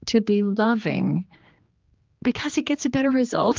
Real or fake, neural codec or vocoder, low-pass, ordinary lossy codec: fake; codec, 16 kHz, 2 kbps, X-Codec, HuBERT features, trained on general audio; 7.2 kHz; Opus, 24 kbps